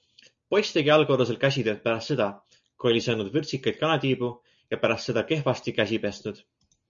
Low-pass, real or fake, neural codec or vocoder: 7.2 kHz; real; none